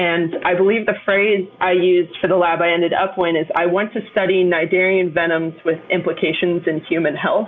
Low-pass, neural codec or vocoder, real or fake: 7.2 kHz; none; real